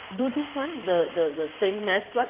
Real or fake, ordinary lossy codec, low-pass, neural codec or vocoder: fake; Opus, 32 kbps; 3.6 kHz; codec, 16 kHz in and 24 kHz out, 2.2 kbps, FireRedTTS-2 codec